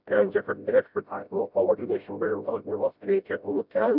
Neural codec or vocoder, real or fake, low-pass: codec, 16 kHz, 0.5 kbps, FreqCodec, smaller model; fake; 5.4 kHz